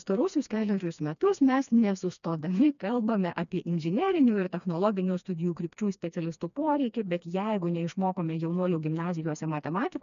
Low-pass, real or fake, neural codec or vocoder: 7.2 kHz; fake; codec, 16 kHz, 2 kbps, FreqCodec, smaller model